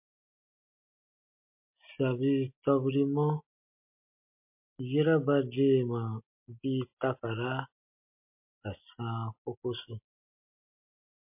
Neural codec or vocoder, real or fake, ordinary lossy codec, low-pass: none; real; MP3, 32 kbps; 3.6 kHz